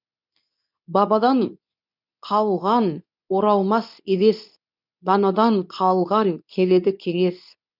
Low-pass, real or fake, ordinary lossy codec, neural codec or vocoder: 5.4 kHz; fake; none; codec, 24 kHz, 0.9 kbps, WavTokenizer, medium speech release version 2